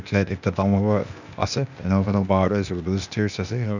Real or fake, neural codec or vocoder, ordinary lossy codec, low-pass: fake; codec, 16 kHz, 0.8 kbps, ZipCodec; none; 7.2 kHz